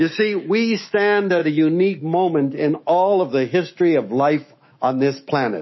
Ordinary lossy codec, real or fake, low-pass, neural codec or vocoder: MP3, 24 kbps; fake; 7.2 kHz; autoencoder, 48 kHz, 128 numbers a frame, DAC-VAE, trained on Japanese speech